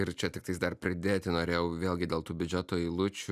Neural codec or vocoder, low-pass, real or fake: none; 14.4 kHz; real